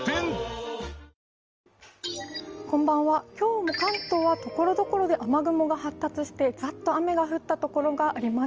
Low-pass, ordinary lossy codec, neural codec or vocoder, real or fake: 7.2 kHz; Opus, 24 kbps; none; real